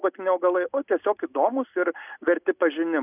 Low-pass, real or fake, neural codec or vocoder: 3.6 kHz; real; none